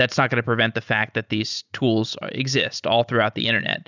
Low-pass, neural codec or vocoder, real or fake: 7.2 kHz; none; real